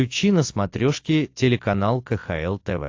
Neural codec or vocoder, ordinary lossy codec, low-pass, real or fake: none; AAC, 48 kbps; 7.2 kHz; real